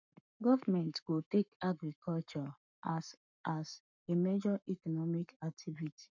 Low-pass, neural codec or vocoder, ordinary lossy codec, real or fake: 7.2 kHz; codec, 44.1 kHz, 7.8 kbps, Pupu-Codec; none; fake